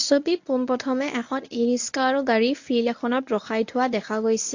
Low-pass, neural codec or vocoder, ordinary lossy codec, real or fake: 7.2 kHz; codec, 24 kHz, 0.9 kbps, WavTokenizer, medium speech release version 1; AAC, 48 kbps; fake